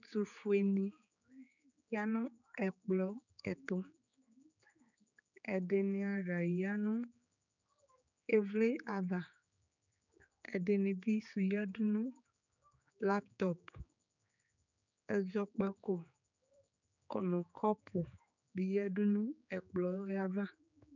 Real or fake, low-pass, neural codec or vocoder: fake; 7.2 kHz; codec, 16 kHz, 4 kbps, X-Codec, HuBERT features, trained on general audio